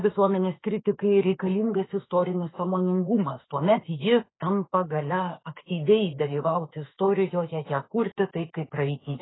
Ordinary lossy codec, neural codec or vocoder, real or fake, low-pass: AAC, 16 kbps; autoencoder, 48 kHz, 32 numbers a frame, DAC-VAE, trained on Japanese speech; fake; 7.2 kHz